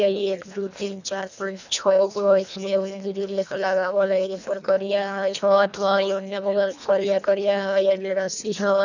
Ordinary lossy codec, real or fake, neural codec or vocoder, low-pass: none; fake; codec, 24 kHz, 1.5 kbps, HILCodec; 7.2 kHz